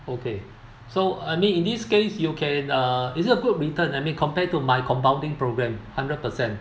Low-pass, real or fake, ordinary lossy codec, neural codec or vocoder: none; real; none; none